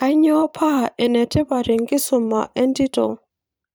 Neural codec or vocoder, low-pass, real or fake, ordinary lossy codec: vocoder, 44.1 kHz, 128 mel bands every 512 samples, BigVGAN v2; none; fake; none